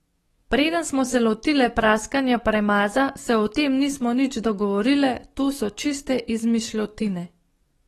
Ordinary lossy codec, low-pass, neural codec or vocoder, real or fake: AAC, 32 kbps; 19.8 kHz; codec, 44.1 kHz, 7.8 kbps, DAC; fake